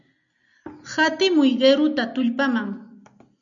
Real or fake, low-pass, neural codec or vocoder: real; 7.2 kHz; none